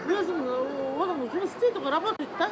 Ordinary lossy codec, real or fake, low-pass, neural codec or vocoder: none; real; none; none